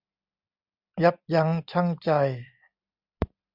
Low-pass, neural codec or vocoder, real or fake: 5.4 kHz; none; real